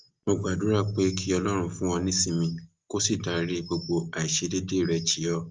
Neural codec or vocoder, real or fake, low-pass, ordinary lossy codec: none; real; 7.2 kHz; Opus, 24 kbps